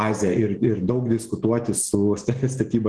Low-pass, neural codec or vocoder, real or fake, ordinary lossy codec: 9.9 kHz; none; real; Opus, 16 kbps